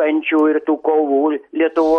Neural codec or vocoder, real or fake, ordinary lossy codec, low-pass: none; real; MP3, 48 kbps; 14.4 kHz